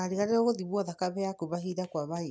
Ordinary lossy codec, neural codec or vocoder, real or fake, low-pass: none; none; real; none